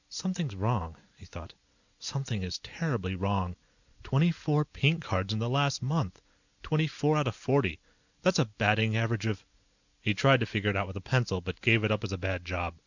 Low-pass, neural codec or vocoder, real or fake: 7.2 kHz; none; real